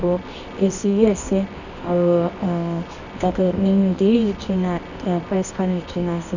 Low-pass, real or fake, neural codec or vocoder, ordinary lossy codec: 7.2 kHz; fake; codec, 24 kHz, 0.9 kbps, WavTokenizer, medium music audio release; none